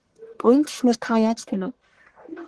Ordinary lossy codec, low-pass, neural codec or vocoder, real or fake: Opus, 16 kbps; 10.8 kHz; codec, 44.1 kHz, 1.7 kbps, Pupu-Codec; fake